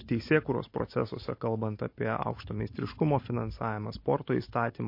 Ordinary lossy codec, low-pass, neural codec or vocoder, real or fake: MP3, 32 kbps; 5.4 kHz; none; real